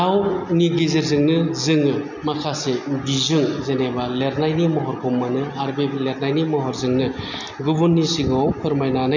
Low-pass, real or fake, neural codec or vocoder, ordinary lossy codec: 7.2 kHz; real; none; none